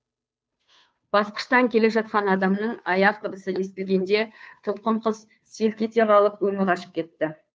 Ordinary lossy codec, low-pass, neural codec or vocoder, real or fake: none; none; codec, 16 kHz, 2 kbps, FunCodec, trained on Chinese and English, 25 frames a second; fake